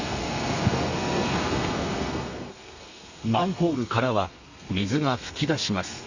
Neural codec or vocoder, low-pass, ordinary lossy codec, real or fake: codec, 32 kHz, 1.9 kbps, SNAC; 7.2 kHz; Opus, 64 kbps; fake